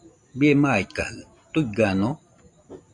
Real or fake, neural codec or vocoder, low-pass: real; none; 10.8 kHz